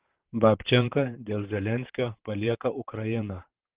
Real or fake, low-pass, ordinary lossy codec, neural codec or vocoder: fake; 3.6 kHz; Opus, 16 kbps; vocoder, 44.1 kHz, 128 mel bands, Pupu-Vocoder